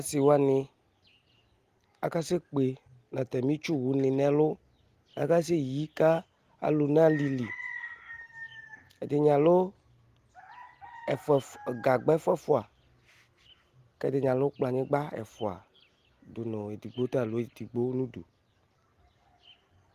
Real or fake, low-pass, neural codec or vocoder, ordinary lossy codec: real; 14.4 kHz; none; Opus, 24 kbps